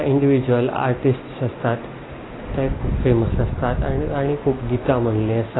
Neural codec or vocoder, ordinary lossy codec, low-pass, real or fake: none; AAC, 16 kbps; 7.2 kHz; real